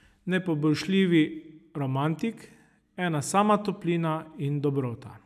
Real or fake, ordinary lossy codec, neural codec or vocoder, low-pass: real; none; none; 14.4 kHz